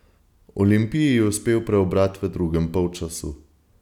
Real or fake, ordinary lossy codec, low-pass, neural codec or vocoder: real; none; 19.8 kHz; none